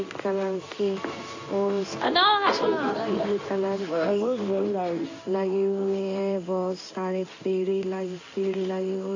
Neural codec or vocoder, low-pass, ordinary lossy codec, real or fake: codec, 16 kHz in and 24 kHz out, 1 kbps, XY-Tokenizer; 7.2 kHz; AAC, 48 kbps; fake